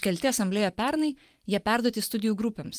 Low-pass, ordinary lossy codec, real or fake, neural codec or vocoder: 14.4 kHz; Opus, 32 kbps; fake; vocoder, 44.1 kHz, 128 mel bands every 512 samples, BigVGAN v2